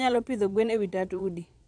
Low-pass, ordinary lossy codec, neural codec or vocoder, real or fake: 9.9 kHz; none; vocoder, 22.05 kHz, 80 mel bands, Vocos; fake